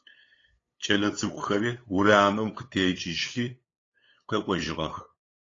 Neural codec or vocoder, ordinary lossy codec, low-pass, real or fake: codec, 16 kHz, 8 kbps, FunCodec, trained on LibriTTS, 25 frames a second; AAC, 32 kbps; 7.2 kHz; fake